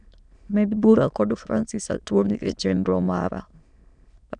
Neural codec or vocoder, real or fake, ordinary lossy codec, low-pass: autoencoder, 22.05 kHz, a latent of 192 numbers a frame, VITS, trained on many speakers; fake; none; 9.9 kHz